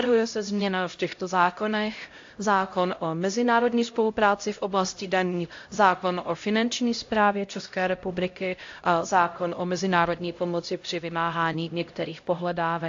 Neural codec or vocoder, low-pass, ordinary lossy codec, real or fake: codec, 16 kHz, 0.5 kbps, X-Codec, HuBERT features, trained on LibriSpeech; 7.2 kHz; AAC, 48 kbps; fake